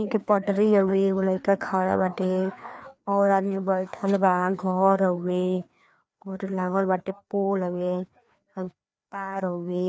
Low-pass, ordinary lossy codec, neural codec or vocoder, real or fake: none; none; codec, 16 kHz, 2 kbps, FreqCodec, larger model; fake